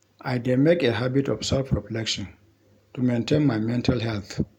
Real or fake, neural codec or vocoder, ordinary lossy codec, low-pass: real; none; none; 19.8 kHz